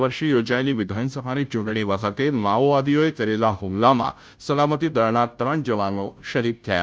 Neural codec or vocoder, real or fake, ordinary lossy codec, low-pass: codec, 16 kHz, 0.5 kbps, FunCodec, trained on Chinese and English, 25 frames a second; fake; none; none